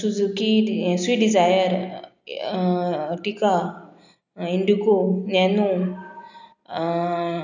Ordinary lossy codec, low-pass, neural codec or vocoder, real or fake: none; 7.2 kHz; none; real